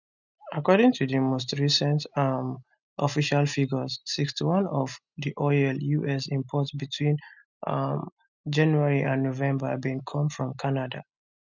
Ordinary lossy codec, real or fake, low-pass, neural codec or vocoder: none; real; 7.2 kHz; none